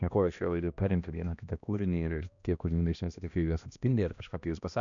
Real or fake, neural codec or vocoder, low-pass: fake; codec, 16 kHz, 1 kbps, X-Codec, HuBERT features, trained on balanced general audio; 7.2 kHz